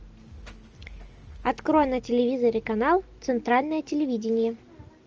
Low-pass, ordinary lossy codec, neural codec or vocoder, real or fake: 7.2 kHz; Opus, 24 kbps; none; real